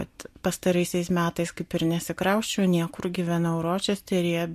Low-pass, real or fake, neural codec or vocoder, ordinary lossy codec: 14.4 kHz; real; none; MP3, 64 kbps